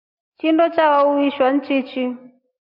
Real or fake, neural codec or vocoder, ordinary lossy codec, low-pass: real; none; MP3, 48 kbps; 5.4 kHz